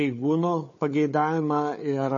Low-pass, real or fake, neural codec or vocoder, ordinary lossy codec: 7.2 kHz; fake; codec, 16 kHz, 4 kbps, FunCodec, trained on Chinese and English, 50 frames a second; MP3, 32 kbps